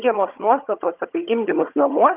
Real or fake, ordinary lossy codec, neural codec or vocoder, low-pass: fake; Opus, 24 kbps; vocoder, 22.05 kHz, 80 mel bands, HiFi-GAN; 3.6 kHz